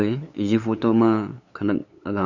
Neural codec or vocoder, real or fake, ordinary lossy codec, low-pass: codec, 16 kHz, 8 kbps, FunCodec, trained on LibriTTS, 25 frames a second; fake; none; 7.2 kHz